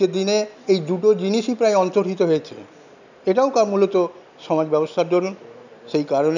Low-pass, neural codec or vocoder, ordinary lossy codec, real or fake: 7.2 kHz; none; none; real